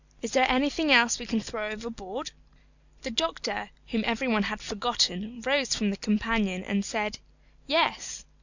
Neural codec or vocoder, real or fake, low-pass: none; real; 7.2 kHz